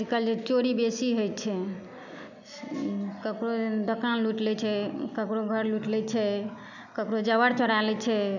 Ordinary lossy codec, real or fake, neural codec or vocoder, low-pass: none; real; none; 7.2 kHz